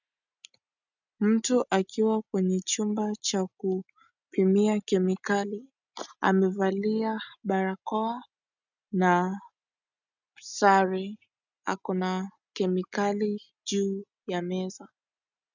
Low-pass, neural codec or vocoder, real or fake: 7.2 kHz; none; real